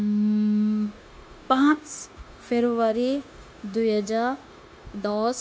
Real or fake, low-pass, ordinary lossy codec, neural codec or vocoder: fake; none; none; codec, 16 kHz, 0.9 kbps, LongCat-Audio-Codec